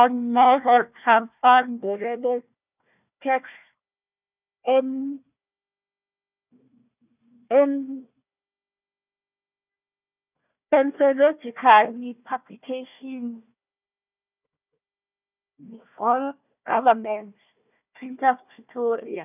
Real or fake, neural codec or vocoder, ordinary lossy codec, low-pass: fake; codec, 16 kHz, 1 kbps, FunCodec, trained on Chinese and English, 50 frames a second; none; 3.6 kHz